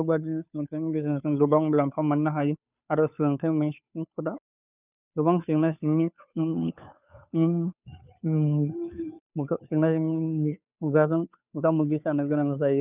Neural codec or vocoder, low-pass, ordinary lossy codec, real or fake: codec, 16 kHz, 2 kbps, FunCodec, trained on Chinese and English, 25 frames a second; 3.6 kHz; none; fake